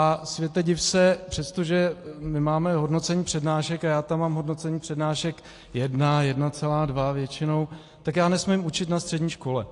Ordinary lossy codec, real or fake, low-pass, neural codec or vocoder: AAC, 48 kbps; real; 10.8 kHz; none